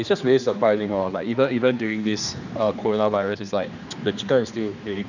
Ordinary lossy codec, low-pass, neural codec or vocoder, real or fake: none; 7.2 kHz; codec, 16 kHz, 2 kbps, X-Codec, HuBERT features, trained on general audio; fake